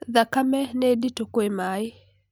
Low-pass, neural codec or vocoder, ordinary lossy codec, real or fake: none; none; none; real